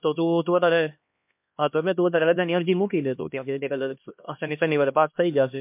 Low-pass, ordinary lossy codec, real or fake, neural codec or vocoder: 3.6 kHz; MP3, 32 kbps; fake; codec, 16 kHz, 1 kbps, X-Codec, HuBERT features, trained on LibriSpeech